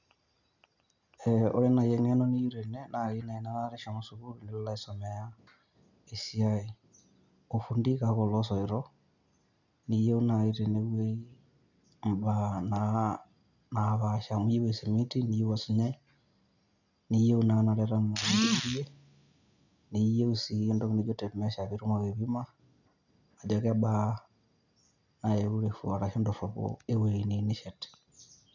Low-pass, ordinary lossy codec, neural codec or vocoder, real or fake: 7.2 kHz; none; none; real